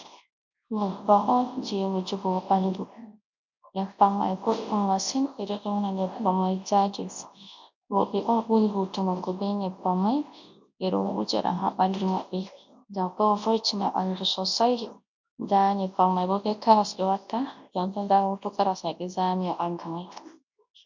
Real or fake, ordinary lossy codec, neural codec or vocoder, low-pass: fake; MP3, 48 kbps; codec, 24 kHz, 0.9 kbps, WavTokenizer, large speech release; 7.2 kHz